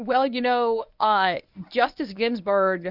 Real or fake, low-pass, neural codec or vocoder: fake; 5.4 kHz; codec, 24 kHz, 0.9 kbps, WavTokenizer, medium speech release version 1